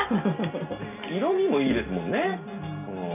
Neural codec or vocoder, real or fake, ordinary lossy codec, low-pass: none; real; none; 3.6 kHz